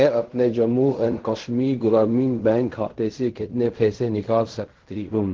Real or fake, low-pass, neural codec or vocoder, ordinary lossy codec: fake; 7.2 kHz; codec, 16 kHz in and 24 kHz out, 0.4 kbps, LongCat-Audio-Codec, fine tuned four codebook decoder; Opus, 16 kbps